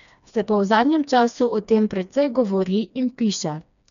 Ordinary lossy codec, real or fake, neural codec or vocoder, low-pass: none; fake; codec, 16 kHz, 2 kbps, FreqCodec, smaller model; 7.2 kHz